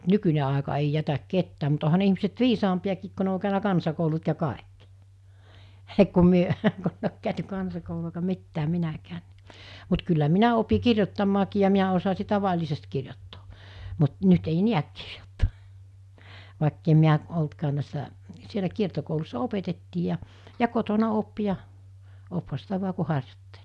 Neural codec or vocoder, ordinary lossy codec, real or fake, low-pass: none; none; real; none